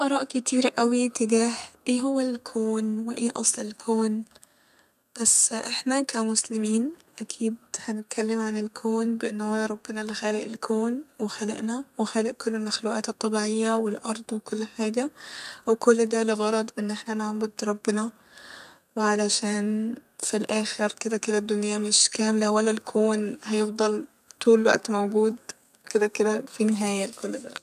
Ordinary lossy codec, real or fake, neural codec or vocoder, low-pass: none; fake; codec, 32 kHz, 1.9 kbps, SNAC; 14.4 kHz